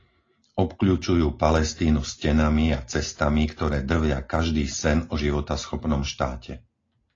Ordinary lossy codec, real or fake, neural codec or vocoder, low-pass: AAC, 32 kbps; real; none; 7.2 kHz